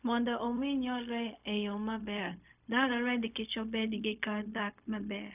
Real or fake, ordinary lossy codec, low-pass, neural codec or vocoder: fake; none; 3.6 kHz; codec, 16 kHz, 0.4 kbps, LongCat-Audio-Codec